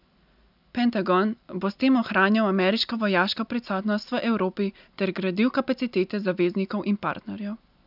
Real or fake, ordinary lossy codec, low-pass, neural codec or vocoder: real; none; 5.4 kHz; none